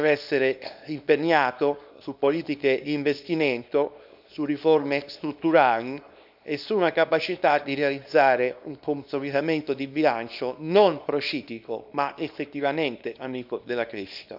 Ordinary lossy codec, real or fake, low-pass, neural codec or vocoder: none; fake; 5.4 kHz; codec, 24 kHz, 0.9 kbps, WavTokenizer, small release